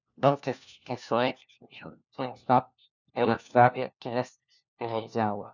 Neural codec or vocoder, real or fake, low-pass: codec, 16 kHz, 1 kbps, FunCodec, trained on LibriTTS, 50 frames a second; fake; 7.2 kHz